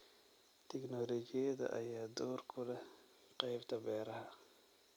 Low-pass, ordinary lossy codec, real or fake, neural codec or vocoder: none; none; real; none